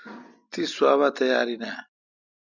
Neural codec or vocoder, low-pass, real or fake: none; 7.2 kHz; real